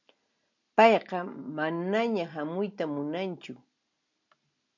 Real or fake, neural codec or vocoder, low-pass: real; none; 7.2 kHz